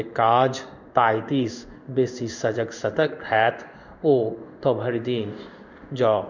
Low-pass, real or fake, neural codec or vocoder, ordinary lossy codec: 7.2 kHz; fake; codec, 16 kHz in and 24 kHz out, 1 kbps, XY-Tokenizer; none